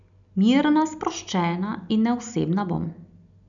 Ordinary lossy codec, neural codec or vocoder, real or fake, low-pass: none; none; real; 7.2 kHz